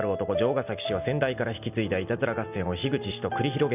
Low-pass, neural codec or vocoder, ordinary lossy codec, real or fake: 3.6 kHz; none; none; real